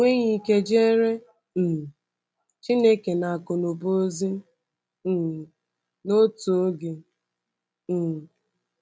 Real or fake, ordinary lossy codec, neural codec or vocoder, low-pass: real; none; none; none